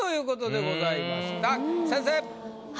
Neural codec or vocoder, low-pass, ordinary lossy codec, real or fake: none; none; none; real